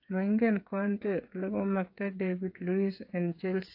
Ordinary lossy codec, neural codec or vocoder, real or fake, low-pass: AAC, 32 kbps; codec, 16 kHz, 8 kbps, FreqCodec, smaller model; fake; 5.4 kHz